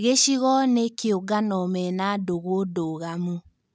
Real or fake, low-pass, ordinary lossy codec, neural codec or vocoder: real; none; none; none